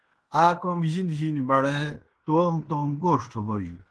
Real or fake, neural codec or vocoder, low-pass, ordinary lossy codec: fake; codec, 16 kHz in and 24 kHz out, 0.9 kbps, LongCat-Audio-Codec, fine tuned four codebook decoder; 10.8 kHz; Opus, 16 kbps